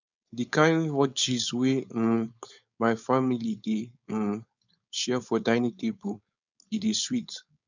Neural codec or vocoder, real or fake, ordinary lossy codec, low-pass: codec, 16 kHz, 4.8 kbps, FACodec; fake; none; 7.2 kHz